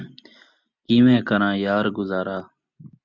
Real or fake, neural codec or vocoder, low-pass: real; none; 7.2 kHz